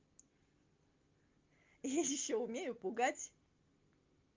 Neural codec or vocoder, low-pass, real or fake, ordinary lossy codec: none; 7.2 kHz; real; Opus, 32 kbps